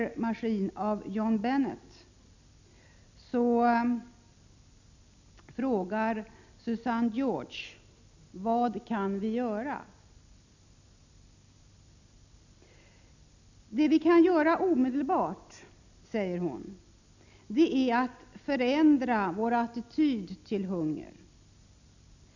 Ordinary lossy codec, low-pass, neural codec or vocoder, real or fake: none; 7.2 kHz; none; real